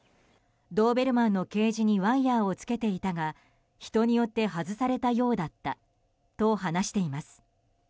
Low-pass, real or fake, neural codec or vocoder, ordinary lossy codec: none; real; none; none